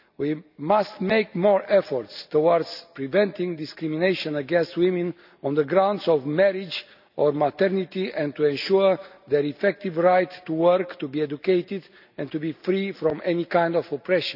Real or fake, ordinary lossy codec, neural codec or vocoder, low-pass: real; none; none; 5.4 kHz